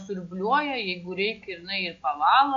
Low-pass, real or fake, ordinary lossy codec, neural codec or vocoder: 7.2 kHz; real; AAC, 48 kbps; none